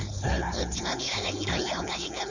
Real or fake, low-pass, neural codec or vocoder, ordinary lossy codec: fake; 7.2 kHz; codec, 16 kHz, 4.8 kbps, FACodec; none